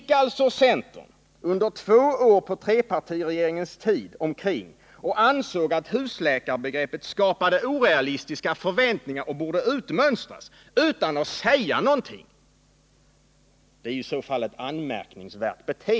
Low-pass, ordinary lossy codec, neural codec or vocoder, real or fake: none; none; none; real